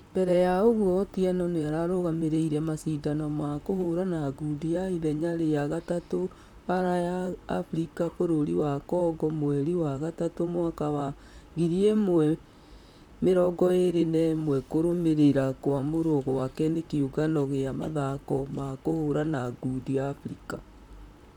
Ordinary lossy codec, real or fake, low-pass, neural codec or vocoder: none; fake; 19.8 kHz; vocoder, 44.1 kHz, 128 mel bands, Pupu-Vocoder